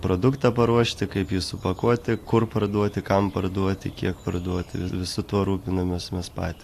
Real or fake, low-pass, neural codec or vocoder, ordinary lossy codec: real; 14.4 kHz; none; AAC, 64 kbps